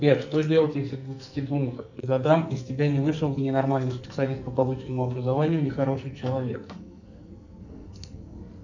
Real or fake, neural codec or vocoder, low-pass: fake; codec, 44.1 kHz, 2.6 kbps, SNAC; 7.2 kHz